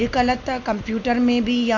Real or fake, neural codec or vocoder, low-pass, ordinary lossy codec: real; none; 7.2 kHz; none